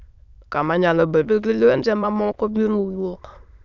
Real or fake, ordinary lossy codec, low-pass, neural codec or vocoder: fake; none; 7.2 kHz; autoencoder, 22.05 kHz, a latent of 192 numbers a frame, VITS, trained on many speakers